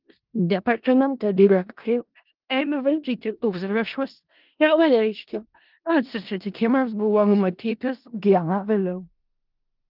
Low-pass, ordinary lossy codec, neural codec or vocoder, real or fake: 5.4 kHz; Opus, 32 kbps; codec, 16 kHz in and 24 kHz out, 0.4 kbps, LongCat-Audio-Codec, four codebook decoder; fake